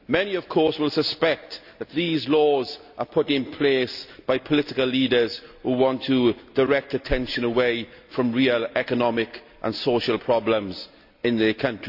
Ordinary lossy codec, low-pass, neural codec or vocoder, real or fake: AAC, 48 kbps; 5.4 kHz; none; real